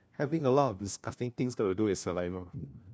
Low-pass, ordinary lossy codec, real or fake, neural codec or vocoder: none; none; fake; codec, 16 kHz, 1 kbps, FunCodec, trained on LibriTTS, 50 frames a second